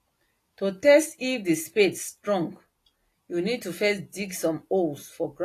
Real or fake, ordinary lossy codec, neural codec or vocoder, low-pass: real; AAC, 48 kbps; none; 14.4 kHz